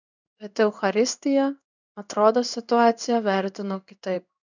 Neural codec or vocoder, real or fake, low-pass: codec, 16 kHz in and 24 kHz out, 1 kbps, XY-Tokenizer; fake; 7.2 kHz